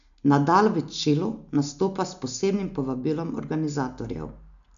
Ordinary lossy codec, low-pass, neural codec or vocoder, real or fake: none; 7.2 kHz; none; real